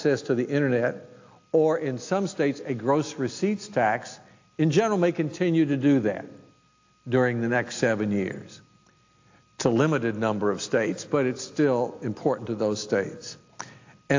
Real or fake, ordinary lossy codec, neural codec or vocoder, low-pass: real; AAC, 48 kbps; none; 7.2 kHz